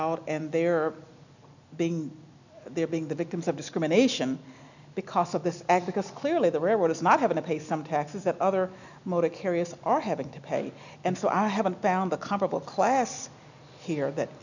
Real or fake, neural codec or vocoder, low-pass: real; none; 7.2 kHz